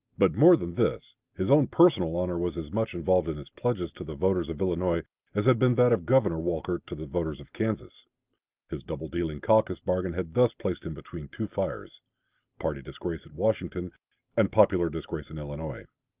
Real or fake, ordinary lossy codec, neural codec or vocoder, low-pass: real; Opus, 32 kbps; none; 3.6 kHz